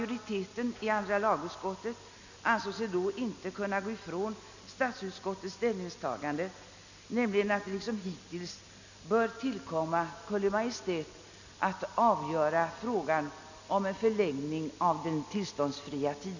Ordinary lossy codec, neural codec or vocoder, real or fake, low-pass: none; none; real; 7.2 kHz